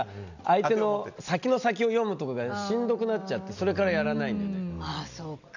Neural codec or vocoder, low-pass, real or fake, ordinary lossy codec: none; 7.2 kHz; real; none